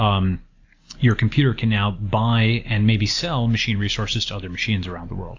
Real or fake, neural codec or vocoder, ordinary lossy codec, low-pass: real; none; AAC, 48 kbps; 7.2 kHz